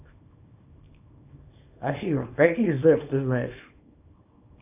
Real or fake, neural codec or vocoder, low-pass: fake; codec, 24 kHz, 0.9 kbps, WavTokenizer, small release; 3.6 kHz